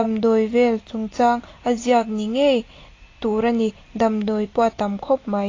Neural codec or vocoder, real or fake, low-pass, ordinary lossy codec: none; real; 7.2 kHz; AAC, 32 kbps